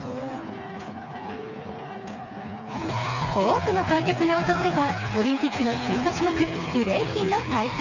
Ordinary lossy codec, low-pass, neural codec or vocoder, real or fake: none; 7.2 kHz; codec, 16 kHz, 4 kbps, FreqCodec, smaller model; fake